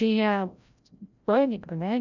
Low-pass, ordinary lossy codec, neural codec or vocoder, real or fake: 7.2 kHz; none; codec, 16 kHz, 0.5 kbps, FreqCodec, larger model; fake